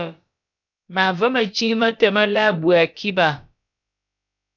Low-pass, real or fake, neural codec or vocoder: 7.2 kHz; fake; codec, 16 kHz, about 1 kbps, DyCAST, with the encoder's durations